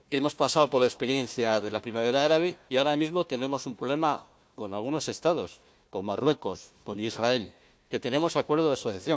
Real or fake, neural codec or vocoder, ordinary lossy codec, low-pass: fake; codec, 16 kHz, 1 kbps, FunCodec, trained on Chinese and English, 50 frames a second; none; none